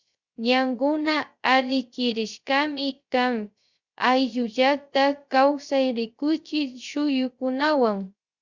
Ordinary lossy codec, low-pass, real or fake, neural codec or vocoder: Opus, 64 kbps; 7.2 kHz; fake; codec, 16 kHz, 0.3 kbps, FocalCodec